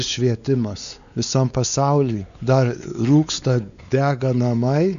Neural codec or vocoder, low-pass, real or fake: codec, 16 kHz, 4 kbps, X-Codec, WavLM features, trained on Multilingual LibriSpeech; 7.2 kHz; fake